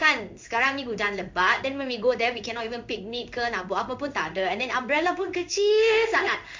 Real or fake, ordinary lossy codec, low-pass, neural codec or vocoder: fake; MP3, 64 kbps; 7.2 kHz; codec, 16 kHz in and 24 kHz out, 1 kbps, XY-Tokenizer